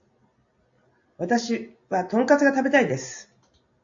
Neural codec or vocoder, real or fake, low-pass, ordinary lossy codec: none; real; 7.2 kHz; AAC, 64 kbps